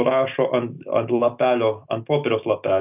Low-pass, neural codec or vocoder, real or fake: 3.6 kHz; none; real